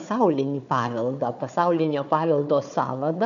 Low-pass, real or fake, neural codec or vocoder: 7.2 kHz; fake; codec, 16 kHz, 4 kbps, FunCodec, trained on Chinese and English, 50 frames a second